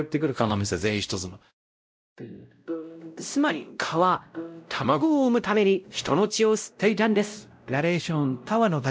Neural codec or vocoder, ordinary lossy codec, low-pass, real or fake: codec, 16 kHz, 0.5 kbps, X-Codec, WavLM features, trained on Multilingual LibriSpeech; none; none; fake